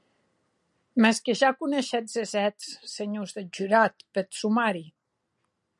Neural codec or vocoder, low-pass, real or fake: none; 10.8 kHz; real